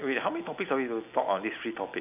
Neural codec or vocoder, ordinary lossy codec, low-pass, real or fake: none; none; 3.6 kHz; real